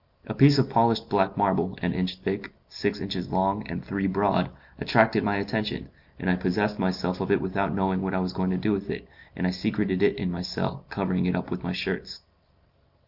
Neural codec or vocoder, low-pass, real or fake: none; 5.4 kHz; real